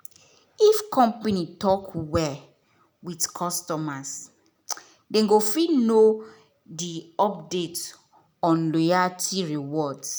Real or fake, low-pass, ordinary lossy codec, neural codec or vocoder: real; none; none; none